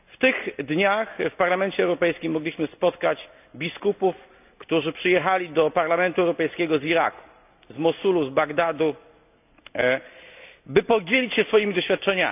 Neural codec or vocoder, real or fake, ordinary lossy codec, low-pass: none; real; none; 3.6 kHz